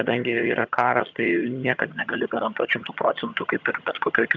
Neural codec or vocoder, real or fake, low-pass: vocoder, 22.05 kHz, 80 mel bands, HiFi-GAN; fake; 7.2 kHz